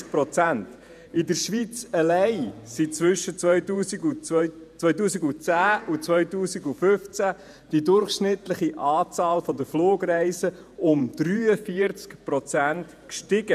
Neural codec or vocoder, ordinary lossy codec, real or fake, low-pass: vocoder, 48 kHz, 128 mel bands, Vocos; none; fake; 14.4 kHz